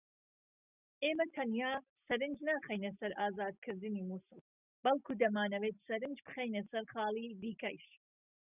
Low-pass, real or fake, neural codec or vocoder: 3.6 kHz; real; none